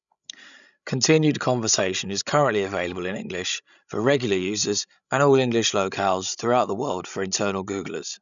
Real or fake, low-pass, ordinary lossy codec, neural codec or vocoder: fake; 7.2 kHz; none; codec, 16 kHz, 16 kbps, FreqCodec, larger model